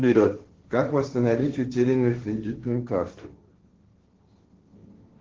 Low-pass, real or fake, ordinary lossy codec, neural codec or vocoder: 7.2 kHz; fake; Opus, 16 kbps; codec, 16 kHz, 1.1 kbps, Voila-Tokenizer